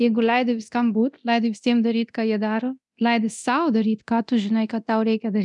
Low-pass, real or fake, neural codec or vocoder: 10.8 kHz; fake; codec, 24 kHz, 0.9 kbps, DualCodec